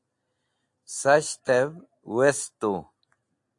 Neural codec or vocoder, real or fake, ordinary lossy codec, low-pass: none; real; AAC, 64 kbps; 10.8 kHz